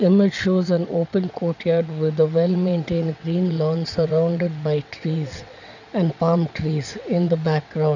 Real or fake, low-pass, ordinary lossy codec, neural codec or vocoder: fake; 7.2 kHz; none; vocoder, 22.05 kHz, 80 mel bands, WaveNeXt